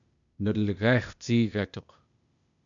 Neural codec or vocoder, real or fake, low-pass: codec, 16 kHz, 0.8 kbps, ZipCodec; fake; 7.2 kHz